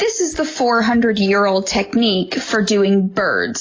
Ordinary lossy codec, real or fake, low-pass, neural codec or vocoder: AAC, 32 kbps; real; 7.2 kHz; none